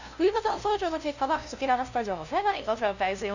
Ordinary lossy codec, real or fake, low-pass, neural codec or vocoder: none; fake; 7.2 kHz; codec, 16 kHz, 0.5 kbps, FunCodec, trained on LibriTTS, 25 frames a second